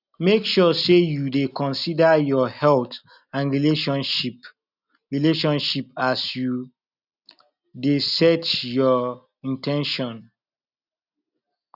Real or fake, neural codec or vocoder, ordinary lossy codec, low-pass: real; none; none; 5.4 kHz